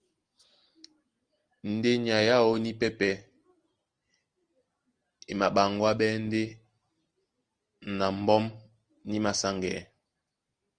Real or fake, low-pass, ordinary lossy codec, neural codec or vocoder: real; 9.9 kHz; Opus, 24 kbps; none